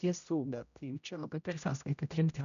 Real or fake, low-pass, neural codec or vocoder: fake; 7.2 kHz; codec, 16 kHz, 0.5 kbps, X-Codec, HuBERT features, trained on general audio